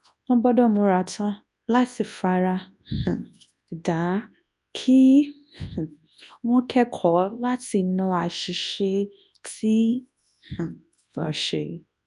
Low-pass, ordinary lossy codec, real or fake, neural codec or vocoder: 10.8 kHz; MP3, 96 kbps; fake; codec, 24 kHz, 0.9 kbps, WavTokenizer, large speech release